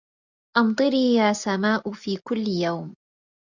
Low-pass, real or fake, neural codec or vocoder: 7.2 kHz; real; none